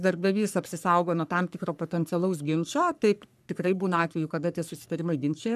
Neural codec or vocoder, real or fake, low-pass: codec, 44.1 kHz, 3.4 kbps, Pupu-Codec; fake; 14.4 kHz